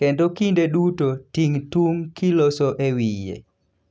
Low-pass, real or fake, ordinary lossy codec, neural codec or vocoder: none; real; none; none